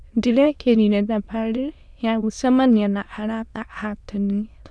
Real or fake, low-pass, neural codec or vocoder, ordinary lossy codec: fake; none; autoencoder, 22.05 kHz, a latent of 192 numbers a frame, VITS, trained on many speakers; none